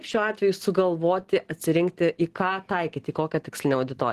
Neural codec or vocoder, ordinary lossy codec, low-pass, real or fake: none; Opus, 16 kbps; 14.4 kHz; real